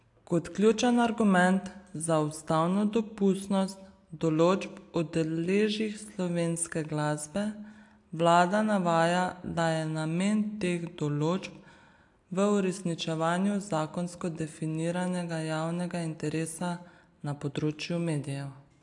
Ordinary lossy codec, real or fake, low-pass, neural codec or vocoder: AAC, 64 kbps; real; 10.8 kHz; none